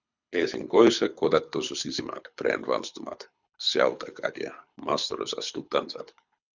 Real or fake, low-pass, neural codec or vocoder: fake; 7.2 kHz; codec, 24 kHz, 6 kbps, HILCodec